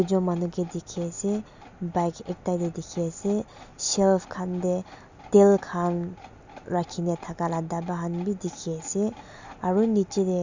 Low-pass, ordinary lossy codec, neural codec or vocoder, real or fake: 7.2 kHz; Opus, 64 kbps; none; real